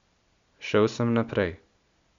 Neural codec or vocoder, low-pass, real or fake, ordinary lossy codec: none; 7.2 kHz; real; MP3, 64 kbps